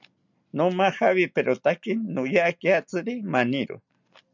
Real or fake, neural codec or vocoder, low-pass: real; none; 7.2 kHz